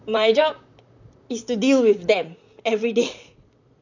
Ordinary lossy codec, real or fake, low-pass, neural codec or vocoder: none; fake; 7.2 kHz; vocoder, 44.1 kHz, 128 mel bands, Pupu-Vocoder